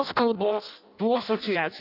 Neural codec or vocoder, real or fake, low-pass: codec, 16 kHz in and 24 kHz out, 0.6 kbps, FireRedTTS-2 codec; fake; 5.4 kHz